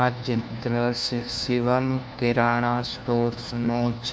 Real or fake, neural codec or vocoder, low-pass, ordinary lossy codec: fake; codec, 16 kHz, 1 kbps, FunCodec, trained on LibriTTS, 50 frames a second; none; none